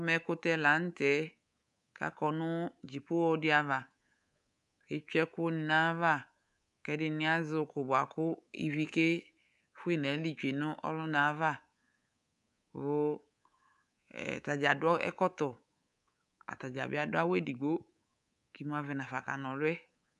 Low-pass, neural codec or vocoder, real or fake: 10.8 kHz; codec, 24 kHz, 3.1 kbps, DualCodec; fake